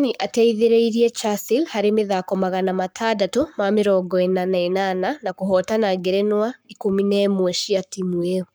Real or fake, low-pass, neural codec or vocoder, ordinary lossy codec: fake; none; codec, 44.1 kHz, 7.8 kbps, Pupu-Codec; none